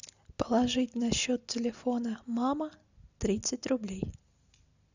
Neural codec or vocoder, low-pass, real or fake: none; 7.2 kHz; real